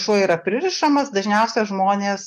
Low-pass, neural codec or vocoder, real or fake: 14.4 kHz; none; real